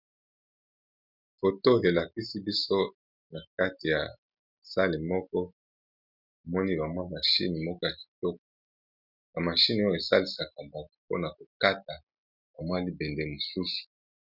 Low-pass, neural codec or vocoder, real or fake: 5.4 kHz; none; real